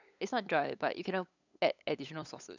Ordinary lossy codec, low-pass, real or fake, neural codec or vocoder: none; 7.2 kHz; fake; codec, 16 kHz, 8 kbps, FunCodec, trained on LibriTTS, 25 frames a second